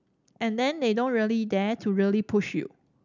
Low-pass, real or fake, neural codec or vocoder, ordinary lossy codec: 7.2 kHz; real; none; none